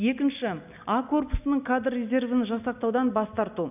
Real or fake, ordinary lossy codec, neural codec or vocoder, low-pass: real; none; none; 3.6 kHz